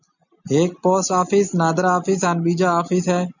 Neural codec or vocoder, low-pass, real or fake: none; 7.2 kHz; real